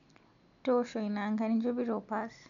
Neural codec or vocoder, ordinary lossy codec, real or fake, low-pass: none; none; real; 7.2 kHz